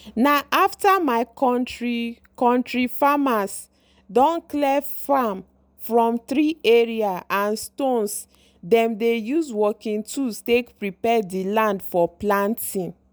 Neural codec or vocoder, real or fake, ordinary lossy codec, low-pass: none; real; none; none